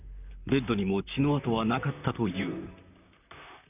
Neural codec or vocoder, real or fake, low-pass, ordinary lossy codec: vocoder, 44.1 kHz, 128 mel bands, Pupu-Vocoder; fake; 3.6 kHz; none